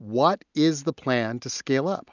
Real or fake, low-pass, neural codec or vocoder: real; 7.2 kHz; none